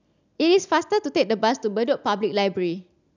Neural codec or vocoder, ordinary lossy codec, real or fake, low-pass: none; none; real; 7.2 kHz